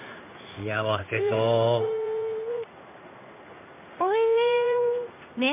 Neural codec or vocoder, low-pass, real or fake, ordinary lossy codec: codec, 16 kHz in and 24 kHz out, 1 kbps, XY-Tokenizer; 3.6 kHz; fake; MP3, 32 kbps